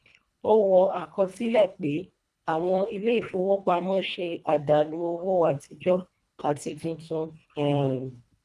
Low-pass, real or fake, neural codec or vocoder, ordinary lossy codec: none; fake; codec, 24 kHz, 1.5 kbps, HILCodec; none